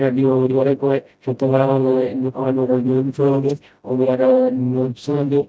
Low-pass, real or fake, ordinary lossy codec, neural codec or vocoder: none; fake; none; codec, 16 kHz, 0.5 kbps, FreqCodec, smaller model